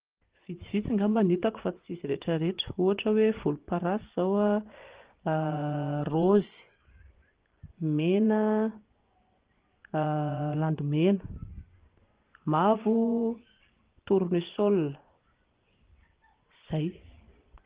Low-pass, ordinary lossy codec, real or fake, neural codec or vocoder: 3.6 kHz; Opus, 16 kbps; fake; vocoder, 24 kHz, 100 mel bands, Vocos